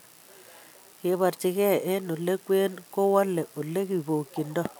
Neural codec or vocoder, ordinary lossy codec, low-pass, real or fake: none; none; none; real